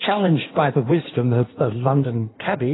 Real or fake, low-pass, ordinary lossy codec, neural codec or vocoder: fake; 7.2 kHz; AAC, 16 kbps; codec, 16 kHz in and 24 kHz out, 1.1 kbps, FireRedTTS-2 codec